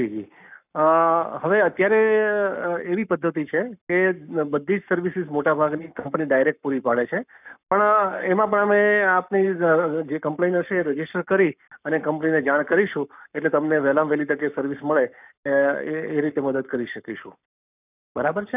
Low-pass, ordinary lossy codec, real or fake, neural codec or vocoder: 3.6 kHz; none; fake; codec, 44.1 kHz, 7.8 kbps, Pupu-Codec